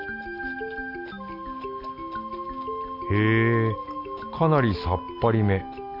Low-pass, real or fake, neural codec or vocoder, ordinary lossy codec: 5.4 kHz; real; none; none